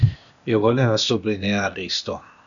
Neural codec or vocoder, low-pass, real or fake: codec, 16 kHz, 0.8 kbps, ZipCodec; 7.2 kHz; fake